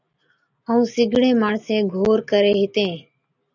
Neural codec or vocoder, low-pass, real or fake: none; 7.2 kHz; real